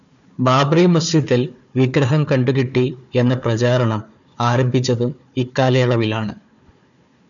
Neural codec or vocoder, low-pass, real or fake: codec, 16 kHz, 4 kbps, FunCodec, trained on Chinese and English, 50 frames a second; 7.2 kHz; fake